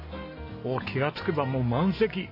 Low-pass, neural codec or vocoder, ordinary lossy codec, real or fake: 5.4 kHz; none; MP3, 24 kbps; real